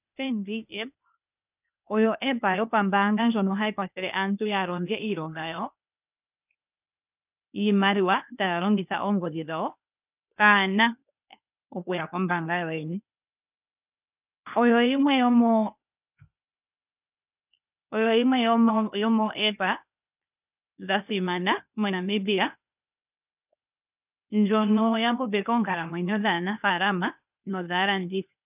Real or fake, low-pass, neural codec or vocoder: fake; 3.6 kHz; codec, 16 kHz, 0.8 kbps, ZipCodec